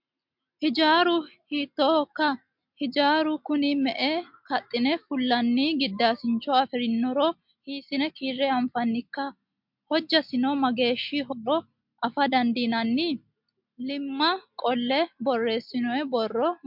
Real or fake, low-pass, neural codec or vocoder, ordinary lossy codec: real; 5.4 kHz; none; MP3, 48 kbps